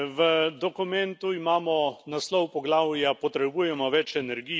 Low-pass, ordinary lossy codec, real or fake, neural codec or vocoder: none; none; real; none